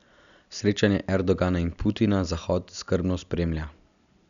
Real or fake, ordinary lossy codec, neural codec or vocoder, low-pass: real; none; none; 7.2 kHz